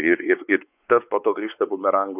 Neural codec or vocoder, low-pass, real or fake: codec, 16 kHz, 4 kbps, X-Codec, WavLM features, trained on Multilingual LibriSpeech; 3.6 kHz; fake